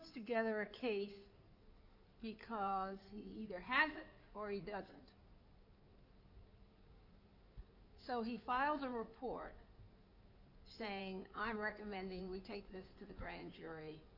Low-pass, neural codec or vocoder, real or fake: 5.4 kHz; codec, 16 kHz in and 24 kHz out, 2.2 kbps, FireRedTTS-2 codec; fake